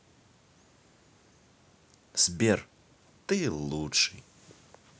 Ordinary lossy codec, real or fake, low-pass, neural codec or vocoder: none; real; none; none